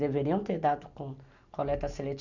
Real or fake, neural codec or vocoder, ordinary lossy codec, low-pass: real; none; none; 7.2 kHz